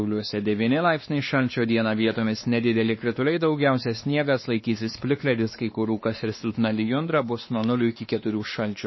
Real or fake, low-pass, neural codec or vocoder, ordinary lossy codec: fake; 7.2 kHz; codec, 16 kHz, 2 kbps, X-Codec, WavLM features, trained on Multilingual LibriSpeech; MP3, 24 kbps